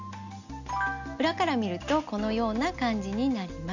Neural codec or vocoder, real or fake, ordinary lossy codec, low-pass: none; real; none; 7.2 kHz